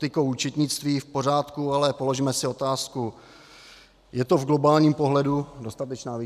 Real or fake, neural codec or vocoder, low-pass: real; none; 14.4 kHz